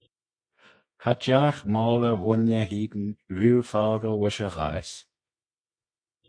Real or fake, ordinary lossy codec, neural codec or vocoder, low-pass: fake; MP3, 48 kbps; codec, 24 kHz, 0.9 kbps, WavTokenizer, medium music audio release; 9.9 kHz